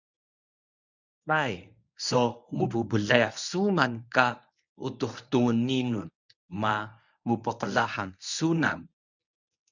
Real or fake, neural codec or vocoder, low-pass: fake; codec, 24 kHz, 0.9 kbps, WavTokenizer, medium speech release version 1; 7.2 kHz